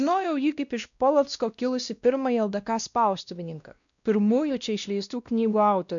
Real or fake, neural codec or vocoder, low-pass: fake; codec, 16 kHz, 1 kbps, X-Codec, WavLM features, trained on Multilingual LibriSpeech; 7.2 kHz